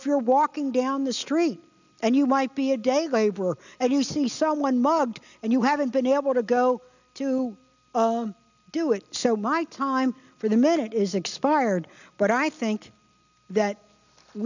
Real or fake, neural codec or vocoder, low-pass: real; none; 7.2 kHz